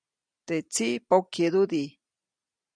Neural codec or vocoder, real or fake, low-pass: none; real; 9.9 kHz